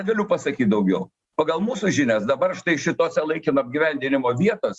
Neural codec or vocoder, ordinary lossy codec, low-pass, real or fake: none; Opus, 64 kbps; 10.8 kHz; real